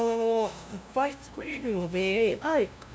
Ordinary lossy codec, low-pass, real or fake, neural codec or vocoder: none; none; fake; codec, 16 kHz, 0.5 kbps, FunCodec, trained on LibriTTS, 25 frames a second